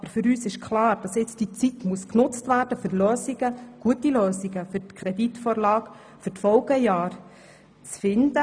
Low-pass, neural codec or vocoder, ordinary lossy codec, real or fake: 9.9 kHz; none; none; real